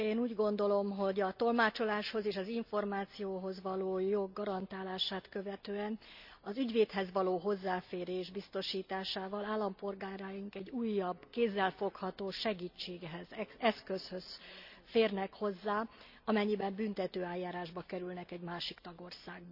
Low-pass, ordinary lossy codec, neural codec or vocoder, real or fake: 5.4 kHz; none; none; real